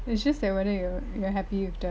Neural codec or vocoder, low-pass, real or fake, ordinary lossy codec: none; none; real; none